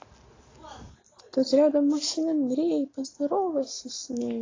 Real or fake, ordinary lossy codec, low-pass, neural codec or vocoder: real; AAC, 32 kbps; 7.2 kHz; none